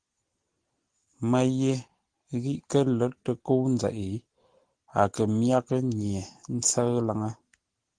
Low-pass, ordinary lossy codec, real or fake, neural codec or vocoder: 9.9 kHz; Opus, 16 kbps; real; none